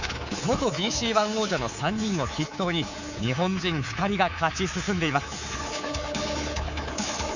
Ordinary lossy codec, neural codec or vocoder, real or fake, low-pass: Opus, 64 kbps; codec, 24 kHz, 3.1 kbps, DualCodec; fake; 7.2 kHz